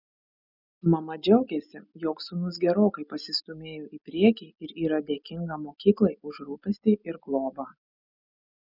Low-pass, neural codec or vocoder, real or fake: 5.4 kHz; none; real